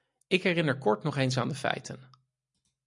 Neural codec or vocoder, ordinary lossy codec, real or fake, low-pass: none; MP3, 96 kbps; real; 10.8 kHz